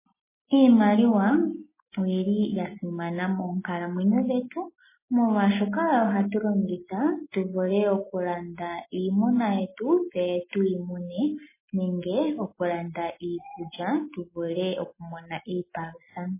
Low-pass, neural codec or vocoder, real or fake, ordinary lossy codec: 3.6 kHz; none; real; MP3, 16 kbps